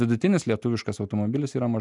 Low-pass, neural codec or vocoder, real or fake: 10.8 kHz; none; real